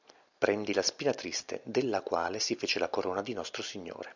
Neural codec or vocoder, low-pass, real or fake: none; 7.2 kHz; real